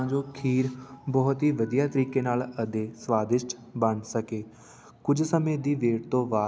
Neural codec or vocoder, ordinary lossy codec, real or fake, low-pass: none; none; real; none